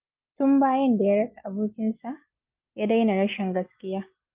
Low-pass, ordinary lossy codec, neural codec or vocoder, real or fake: 3.6 kHz; Opus, 24 kbps; none; real